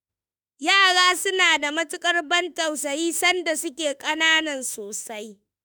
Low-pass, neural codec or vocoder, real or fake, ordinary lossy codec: none; autoencoder, 48 kHz, 32 numbers a frame, DAC-VAE, trained on Japanese speech; fake; none